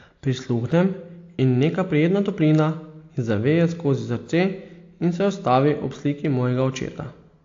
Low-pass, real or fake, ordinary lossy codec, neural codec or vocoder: 7.2 kHz; real; AAC, 48 kbps; none